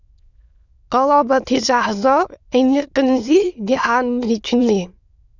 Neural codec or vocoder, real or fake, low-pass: autoencoder, 22.05 kHz, a latent of 192 numbers a frame, VITS, trained on many speakers; fake; 7.2 kHz